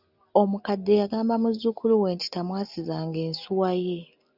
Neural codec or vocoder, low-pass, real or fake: none; 5.4 kHz; real